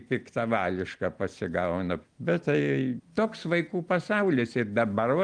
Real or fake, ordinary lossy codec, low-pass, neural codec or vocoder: real; Opus, 32 kbps; 9.9 kHz; none